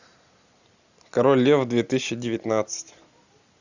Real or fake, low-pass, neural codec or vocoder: real; 7.2 kHz; none